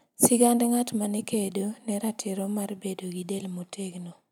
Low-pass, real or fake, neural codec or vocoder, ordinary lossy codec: none; real; none; none